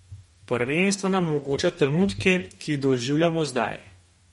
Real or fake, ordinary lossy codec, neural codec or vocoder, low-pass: fake; MP3, 48 kbps; codec, 44.1 kHz, 2.6 kbps, DAC; 19.8 kHz